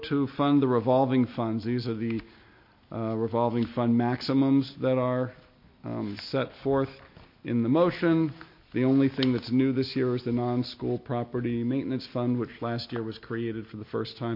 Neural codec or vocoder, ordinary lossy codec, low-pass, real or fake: none; MP3, 32 kbps; 5.4 kHz; real